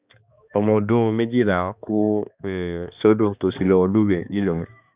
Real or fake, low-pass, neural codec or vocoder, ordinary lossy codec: fake; 3.6 kHz; codec, 16 kHz, 2 kbps, X-Codec, HuBERT features, trained on balanced general audio; Opus, 64 kbps